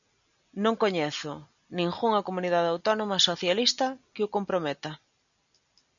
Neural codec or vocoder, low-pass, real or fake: none; 7.2 kHz; real